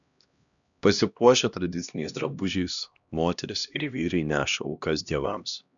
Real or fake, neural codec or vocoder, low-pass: fake; codec, 16 kHz, 1 kbps, X-Codec, HuBERT features, trained on LibriSpeech; 7.2 kHz